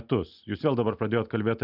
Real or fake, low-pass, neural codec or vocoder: real; 5.4 kHz; none